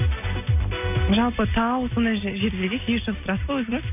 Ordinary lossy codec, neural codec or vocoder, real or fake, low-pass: none; none; real; 3.6 kHz